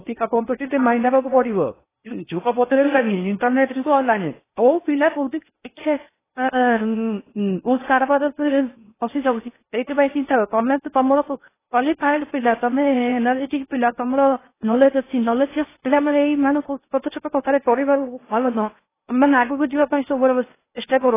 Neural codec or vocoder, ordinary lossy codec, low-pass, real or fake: codec, 16 kHz in and 24 kHz out, 0.6 kbps, FocalCodec, streaming, 4096 codes; AAC, 16 kbps; 3.6 kHz; fake